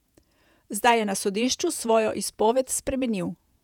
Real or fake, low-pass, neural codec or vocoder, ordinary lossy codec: fake; 19.8 kHz; vocoder, 48 kHz, 128 mel bands, Vocos; none